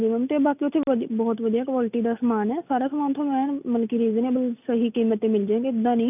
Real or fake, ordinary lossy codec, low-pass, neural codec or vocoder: real; none; 3.6 kHz; none